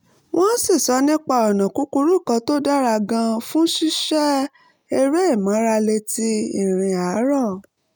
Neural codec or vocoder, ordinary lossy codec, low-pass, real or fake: none; none; none; real